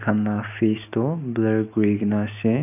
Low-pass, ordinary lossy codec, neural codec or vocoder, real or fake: 3.6 kHz; none; none; real